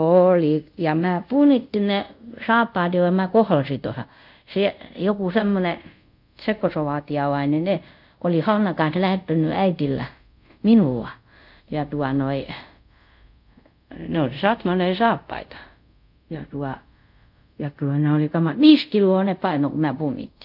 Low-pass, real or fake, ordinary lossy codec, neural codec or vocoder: 5.4 kHz; fake; none; codec, 24 kHz, 0.5 kbps, DualCodec